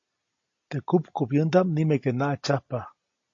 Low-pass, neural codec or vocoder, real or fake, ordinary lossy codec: 7.2 kHz; none; real; AAC, 48 kbps